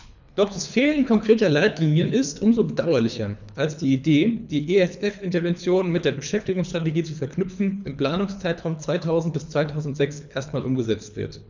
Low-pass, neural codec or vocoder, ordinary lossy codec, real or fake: 7.2 kHz; codec, 24 kHz, 3 kbps, HILCodec; none; fake